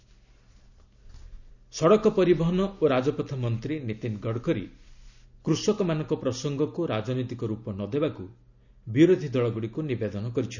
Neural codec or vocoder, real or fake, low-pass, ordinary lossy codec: none; real; 7.2 kHz; none